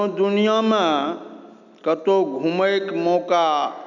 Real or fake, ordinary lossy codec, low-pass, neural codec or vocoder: real; none; 7.2 kHz; none